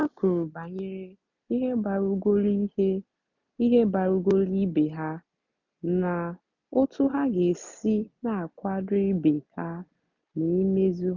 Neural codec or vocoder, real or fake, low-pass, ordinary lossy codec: none; real; 7.2 kHz; none